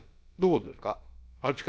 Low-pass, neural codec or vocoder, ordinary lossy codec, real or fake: none; codec, 16 kHz, about 1 kbps, DyCAST, with the encoder's durations; none; fake